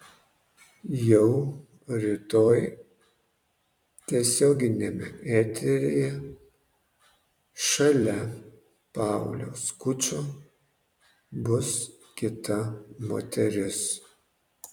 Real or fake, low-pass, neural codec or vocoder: fake; 19.8 kHz; vocoder, 44.1 kHz, 128 mel bands every 256 samples, BigVGAN v2